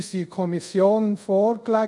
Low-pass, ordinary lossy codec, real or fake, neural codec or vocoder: 10.8 kHz; none; fake; codec, 24 kHz, 0.5 kbps, DualCodec